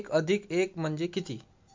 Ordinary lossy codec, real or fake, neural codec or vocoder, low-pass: MP3, 48 kbps; real; none; 7.2 kHz